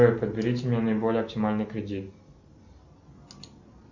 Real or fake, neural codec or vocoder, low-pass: real; none; 7.2 kHz